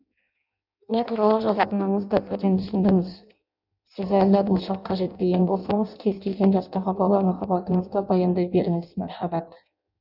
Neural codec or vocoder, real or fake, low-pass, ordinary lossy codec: codec, 16 kHz in and 24 kHz out, 0.6 kbps, FireRedTTS-2 codec; fake; 5.4 kHz; none